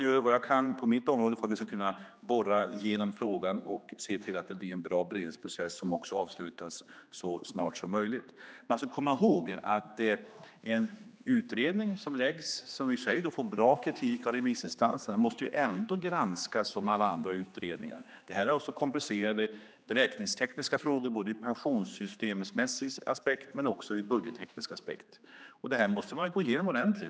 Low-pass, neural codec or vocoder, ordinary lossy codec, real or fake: none; codec, 16 kHz, 2 kbps, X-Codec, HuBERT features, trained on general audio; none; fake